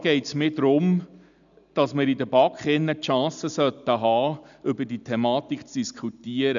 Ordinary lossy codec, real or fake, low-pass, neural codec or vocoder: none; real; 7.2 kHz; none